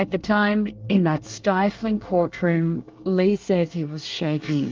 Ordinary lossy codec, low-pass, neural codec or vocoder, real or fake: Opus, 32 kbps; 7.2 kHz; codec, 24 kHz, 1 kbps, SNAC; fake